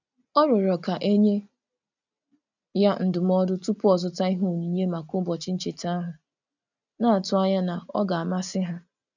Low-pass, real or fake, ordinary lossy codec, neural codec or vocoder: 7.2 kHz; real; none; none